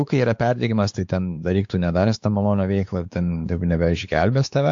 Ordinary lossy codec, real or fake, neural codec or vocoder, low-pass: AAC, 64 kbps; fake; codec, 16 kHz, 4 kbps, X-Codec, WavLM features, trained on Multilingual LibriSpeech; 7.2 kHz